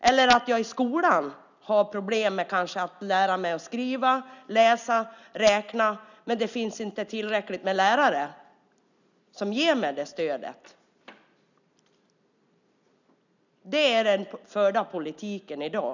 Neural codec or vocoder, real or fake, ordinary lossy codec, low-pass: none; real; none; 7.2 kHz